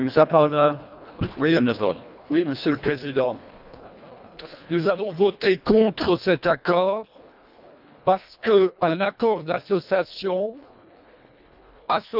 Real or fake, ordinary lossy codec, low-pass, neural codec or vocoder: fake; none; 5.4 kHz; codec, 24 kHz, 1.5 kbps, HILCodec